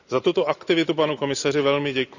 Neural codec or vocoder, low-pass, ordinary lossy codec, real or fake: none; 7.2 kHz; none; real